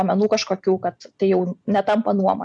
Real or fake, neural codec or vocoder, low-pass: real; none; 9.9 kHz